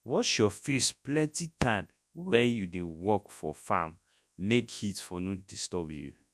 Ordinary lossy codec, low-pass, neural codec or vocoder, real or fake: none; none; codec, 24 kHz, 0.9 kbps, WavTokenizer, large speech release; fake